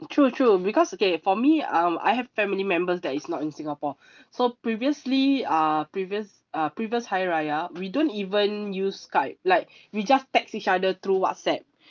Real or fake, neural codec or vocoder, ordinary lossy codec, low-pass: real; none; Opus, 24 kbps; 7.2 kHz